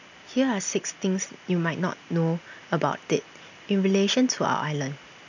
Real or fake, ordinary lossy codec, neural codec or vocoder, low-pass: real; none; none; 7.2 kHz